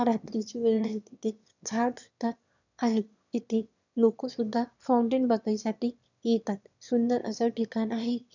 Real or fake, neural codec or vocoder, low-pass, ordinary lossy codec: fake; autoencoder, 22.05 kHz, a latent of 192 numbers a frame, VITS, trained on one speaker; 7.2 kHz; none